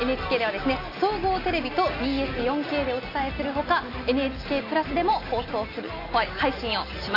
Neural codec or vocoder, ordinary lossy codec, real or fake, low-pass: none; AAC, 32 kbps; real; 5.4 kHz